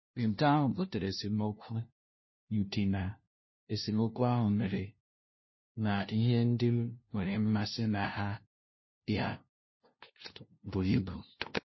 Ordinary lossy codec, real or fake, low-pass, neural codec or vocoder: MP3, 24 kbps; fake; 7.2 kHz; codec, 16 kHz, 0.5 kbps, FunCodec, trained on LibriTTS, 25 frames a second